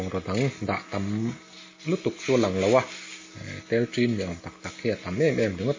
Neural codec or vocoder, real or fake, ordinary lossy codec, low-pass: none; real; MP3, 32 kbps; 7.2 kHz